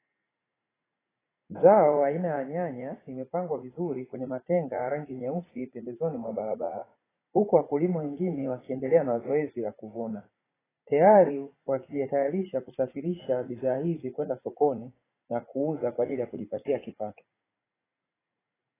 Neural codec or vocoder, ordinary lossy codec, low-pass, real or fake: vocoder, 44.1 kHz, 80 mel bands, Vocos; AAC, 16 kbps; 3.6 kHz; fake